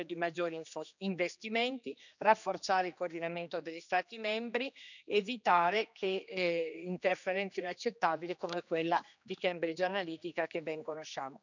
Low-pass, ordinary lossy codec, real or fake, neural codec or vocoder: 7.2 kHz; none; fake; codec, 16 kHz, 2 kbps, X-Codec, HuBERT features, trained on general audio